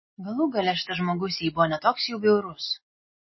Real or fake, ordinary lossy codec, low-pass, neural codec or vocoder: real; MP3, 24 kbps; 7.2 kHz; none